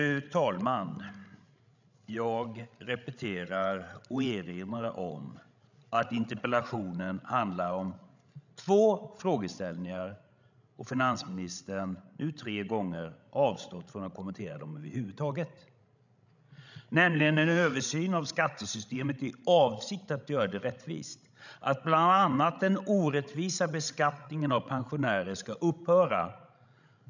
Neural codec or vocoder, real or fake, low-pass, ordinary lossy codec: codec, 16 kHz, 16 kbps, FreqCodec, larger model; fake; 7.2 kHz; none